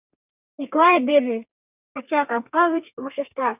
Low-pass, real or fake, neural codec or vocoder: 3.6 kHz; fake; codec, 32 kHz, 1.9 kbps, SNAC